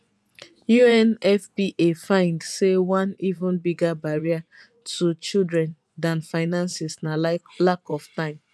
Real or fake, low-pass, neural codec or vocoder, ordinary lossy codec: fake; none; vocoder, 24 kHz, 100 mel bands, Vocos; none